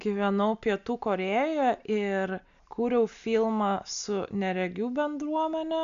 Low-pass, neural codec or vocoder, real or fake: 7.2 kHz; none; real